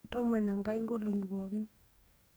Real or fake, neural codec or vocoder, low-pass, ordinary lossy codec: fake; codec, 44.1 kHz, 2.6 kbps, DAC; none; none